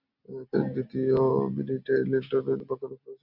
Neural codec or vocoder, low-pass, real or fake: none; 5.4 kHz; real